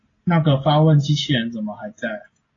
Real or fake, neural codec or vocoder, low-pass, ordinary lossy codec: real; none; 7.2 kHz; AAC, 32 kbps